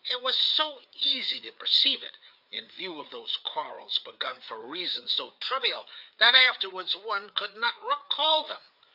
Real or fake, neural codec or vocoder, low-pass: fake; codec, 16 kHz, 4 kbps, FreqCodec, larger model; 5.4 kHz